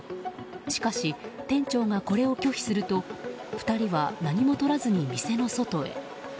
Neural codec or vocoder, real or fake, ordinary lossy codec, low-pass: none; real; none; none